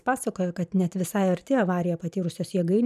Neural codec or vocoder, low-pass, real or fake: vocoder, 44.1 kHz, 128 mel bands every 512 samples, BigVGAN v2; 14.4 kHz; fake